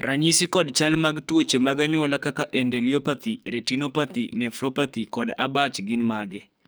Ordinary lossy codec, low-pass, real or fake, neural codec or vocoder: none; none; fake; codec, 44.1 kHz, 2.6 kbps, SNAC